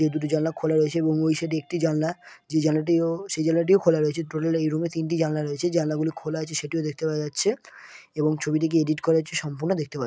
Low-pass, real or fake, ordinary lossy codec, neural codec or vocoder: none; real; none; none